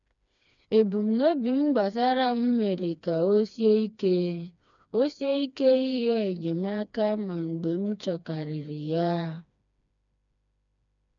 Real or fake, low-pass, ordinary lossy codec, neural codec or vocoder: fake; 7.2 kHz; none; codec, 16 kHz, 2 kbps, FreqCodec, smaller model